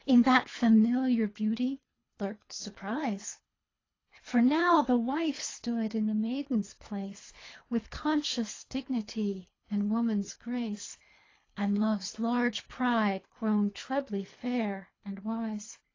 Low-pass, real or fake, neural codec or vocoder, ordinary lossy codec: 7.2 kHz; fake; codec, 24 kHz, 3 kbps, HILCodec; AAC, 32 kbps